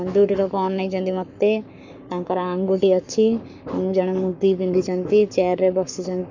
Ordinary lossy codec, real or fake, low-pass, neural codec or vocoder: none; fake; 7.2 kHz; codec, 44.1 kHz, 7.8 kbps, Pupu-Codec